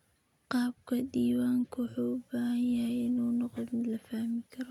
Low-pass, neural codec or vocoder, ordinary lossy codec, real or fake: 19.8 kHz; none; none; real